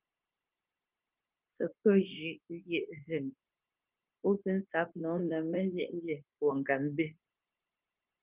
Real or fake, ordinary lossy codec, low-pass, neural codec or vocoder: fake; Opus, 24 kbps; 3.6 kHz; codec, 16 kHz, 0.9 kbps, LongCat-Audio-Codec